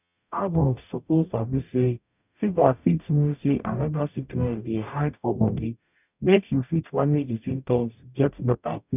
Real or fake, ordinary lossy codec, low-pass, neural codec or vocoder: fake; none; 3.6 kHz; codec, 44.1 kHz, 0.9 kbps, DAC